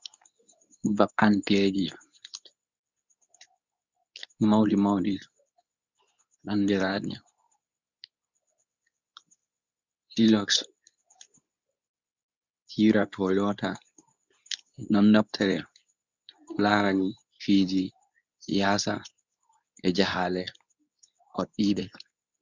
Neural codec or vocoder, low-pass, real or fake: codec, 24 kHz, 0.9 kbps, WavTokenizer, medium speech release version 2; 7.2 kHz; fake